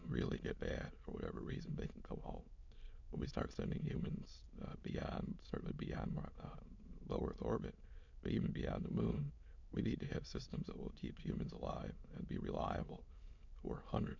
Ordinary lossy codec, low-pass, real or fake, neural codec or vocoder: MP3, 64 kbps; 7.2 kHz; fake; autoencoder, 22.05 kHz, a latent of 192 numbers a frame, VITS, trained on many speakers